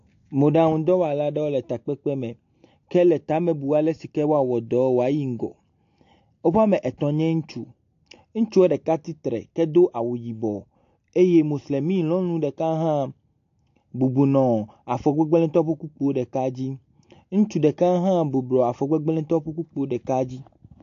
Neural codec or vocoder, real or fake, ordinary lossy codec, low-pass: none; real; AAC, 48 kbps; 7.2 kHz